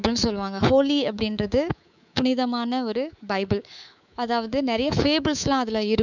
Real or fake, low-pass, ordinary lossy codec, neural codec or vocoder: fake; 7.2 kHz; none; codec, 24 kHz, 3.1 kbps, DualCodec